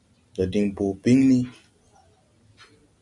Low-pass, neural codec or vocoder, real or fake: 10.8 kHz; none; real